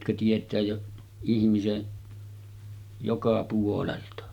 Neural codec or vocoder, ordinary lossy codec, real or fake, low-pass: none; none; real; 19.8 kHz